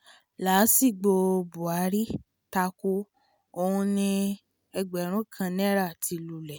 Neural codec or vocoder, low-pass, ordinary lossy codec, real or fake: none; none; none; real